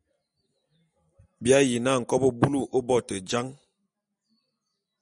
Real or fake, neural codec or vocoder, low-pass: real; none; 9.9 kHz